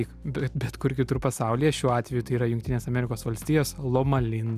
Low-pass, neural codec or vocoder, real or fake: 14.4 kHz; none; real